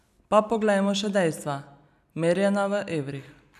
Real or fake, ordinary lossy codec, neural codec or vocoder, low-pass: real; none; none; 14.4 kHz